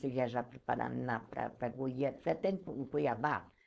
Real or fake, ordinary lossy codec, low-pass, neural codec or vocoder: fake; none; none; codec, 16 kHz, 4.8 kbps, FACodec